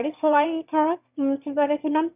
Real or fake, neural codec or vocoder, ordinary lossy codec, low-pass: fake; autoencoder, 22.05 kHz, a latent of 192 numbers a frame, VITS, trained on one speaker; none; 3.6 kHz